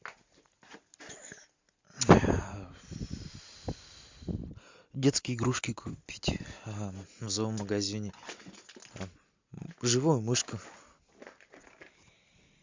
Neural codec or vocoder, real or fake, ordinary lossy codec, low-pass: none; real; MP3, 64 kbps; 7.2 kHz